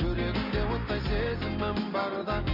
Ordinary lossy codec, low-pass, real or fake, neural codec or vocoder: none; 5.4 kHz; real; none